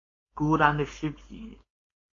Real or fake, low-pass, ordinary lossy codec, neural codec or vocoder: fake; 7.2 kHz; AAC, 32 kbps; codec, 16 kHz, 4.8 kbps, FACodec